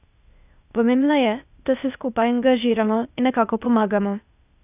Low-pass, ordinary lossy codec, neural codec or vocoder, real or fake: 3.6 kHz; none; codec, 16 kHz, 0.8 kbps, ZipCodec; fake